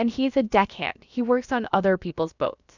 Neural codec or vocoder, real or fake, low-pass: codec, 16 kHz, about 1 kbps, DyCAST, with the encoder's durations; fake; 7.2 kHz